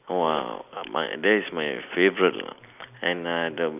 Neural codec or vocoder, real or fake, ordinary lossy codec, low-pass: none; real; none; 3.6 kHz